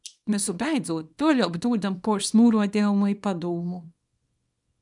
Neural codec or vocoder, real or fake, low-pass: codec, 24 kHz, 0.9 kbps, WavTokenizer, small release; fake; 10.8 kHz